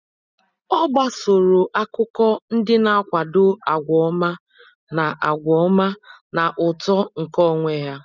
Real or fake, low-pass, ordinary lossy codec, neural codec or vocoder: real; 7.2 kHz; none; none